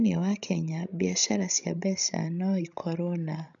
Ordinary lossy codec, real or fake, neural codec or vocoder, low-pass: none; fake; codec, 16 kHz, 16 kbps, FreqCodec, larger model; 7.2 kHz